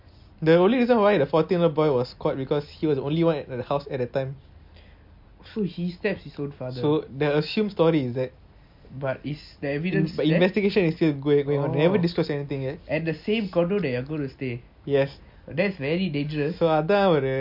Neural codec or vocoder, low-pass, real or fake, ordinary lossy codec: none; 5.4 kHz; real; MP3, 48 kbps